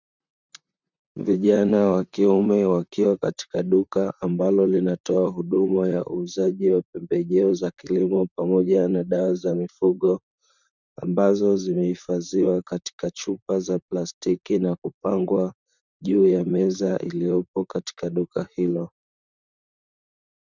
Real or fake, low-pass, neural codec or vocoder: fake; 7.2 kHz; vocoder, 44.1 kHz, 128 mel bands, Pupu-Vocoder